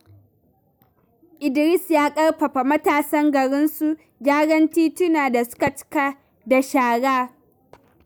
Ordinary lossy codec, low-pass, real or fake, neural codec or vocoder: none; none; real; none